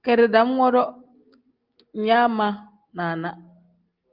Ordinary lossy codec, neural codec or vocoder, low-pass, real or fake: Opus, 32 kbps; none; 5.4 kHz; real